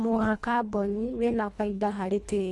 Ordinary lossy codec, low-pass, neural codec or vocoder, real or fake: none; none; codec, 24 kHz, 1.5 kbps, HILCodec; fake